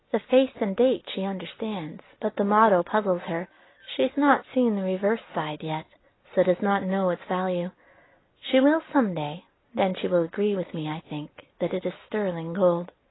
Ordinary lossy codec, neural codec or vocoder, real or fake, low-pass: AAC, 16 kbps; none; real; 7.2 kHz